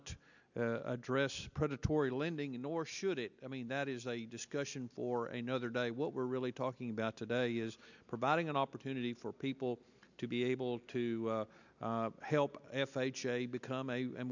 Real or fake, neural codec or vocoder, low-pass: real; none; 7.2 kHz